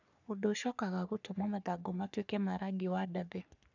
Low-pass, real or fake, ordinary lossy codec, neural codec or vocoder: 7.2 kHz; fake; none; codec, 44.1 kHz, 3.4 kbps, Pupu-Codec